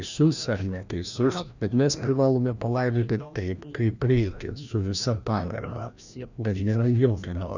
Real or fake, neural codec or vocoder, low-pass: fake; codec, 16 kHz, 1 kbps, FreqCodec, larger model; 7.2 kHz